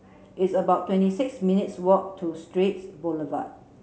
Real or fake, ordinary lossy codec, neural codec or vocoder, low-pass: real; none; none; none